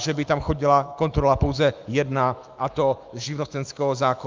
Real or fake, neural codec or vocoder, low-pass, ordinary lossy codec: fake; autoencoder, 48 kHz, 128 numbers a frame, DAC-VAE, trained on Japanese speech; 7.2 kHz; Opus, 24 kbps